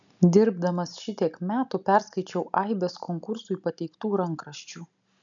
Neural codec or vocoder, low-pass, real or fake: none; 7.2 kHz; real